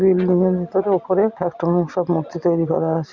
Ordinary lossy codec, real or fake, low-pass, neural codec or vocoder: Opus, 64 kbps; real; 7.2 kHz; none